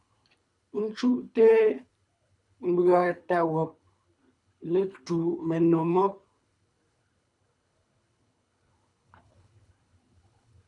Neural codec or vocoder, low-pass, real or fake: codec, 24 kHz, 3 kbps, HILCodec; 10.8 kHz; fake